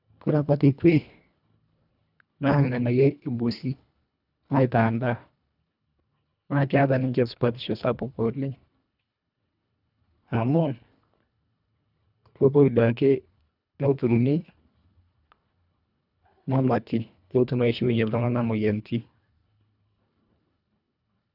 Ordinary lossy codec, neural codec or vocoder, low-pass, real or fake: none; codec, 24 kHz, 1.5 kbps, HILCodec; 5.4 kHz; fake